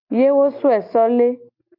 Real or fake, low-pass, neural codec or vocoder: real; 5.4 kHz; none